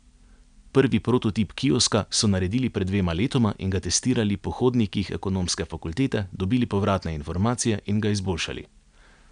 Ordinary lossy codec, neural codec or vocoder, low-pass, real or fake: none; none; 9.9 kHz; real